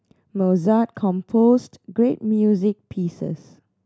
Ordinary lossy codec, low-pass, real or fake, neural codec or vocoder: none; none; real; none